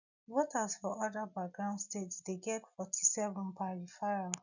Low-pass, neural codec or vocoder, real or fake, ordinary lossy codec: 7.2 kHz; none; real; none